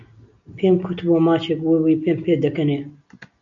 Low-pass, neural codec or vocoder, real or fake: 7.2 kHz; none; real